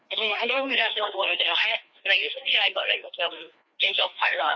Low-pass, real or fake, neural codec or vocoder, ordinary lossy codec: none; fake; codec, 16 kHz, 2 kbps, FreqCodec, larger model; none